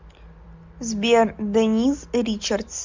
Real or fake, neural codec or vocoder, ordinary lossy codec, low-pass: real; none; MP3, 64 kbps; 7.2 kHz